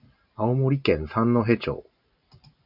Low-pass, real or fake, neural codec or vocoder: 5.4 kHz; real; none